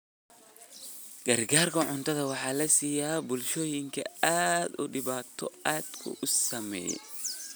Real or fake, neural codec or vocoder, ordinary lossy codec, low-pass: real; none; none; none